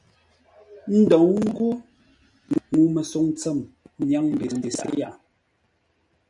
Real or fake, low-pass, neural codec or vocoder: real; 10.8 kHz; none